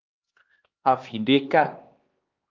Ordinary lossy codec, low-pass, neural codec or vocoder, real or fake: Opus, 32 kbps; 7.2 kHz; codec, 16 kHz, 2 kbps, X-Codec, HuBERT features, trained on LibriSpeech; fake